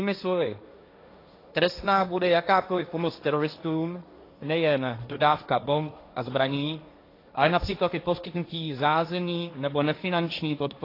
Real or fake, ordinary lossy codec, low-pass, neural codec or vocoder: fake; AAC, 32 kbps; 5.4 kHz; codec, 16 kHz, 1.1 kbps, Voila-Tokenizer